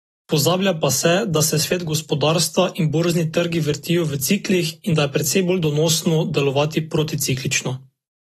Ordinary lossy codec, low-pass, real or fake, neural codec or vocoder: AAC, 32 kbps; 19.8 kHz; real; none